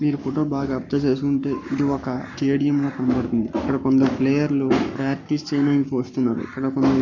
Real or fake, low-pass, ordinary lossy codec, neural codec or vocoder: fake; 7.2 kHz; none; codec, 44.1 kHz, 7.8 kbps, Pupu-Codec